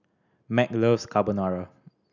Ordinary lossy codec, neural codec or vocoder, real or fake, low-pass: none; none; real; 7.2 kHz